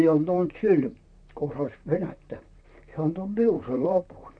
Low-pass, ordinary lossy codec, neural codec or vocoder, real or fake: 9.9 kHz; none; vocoder, 44.1 kHz, 128 mel bands, Pupu-Vocoder; fake